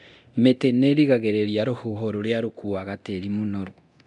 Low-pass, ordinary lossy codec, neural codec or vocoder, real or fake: 10.8 kHz; none; codec, 24 kHz, 0.9 kbps, DualCodec; fake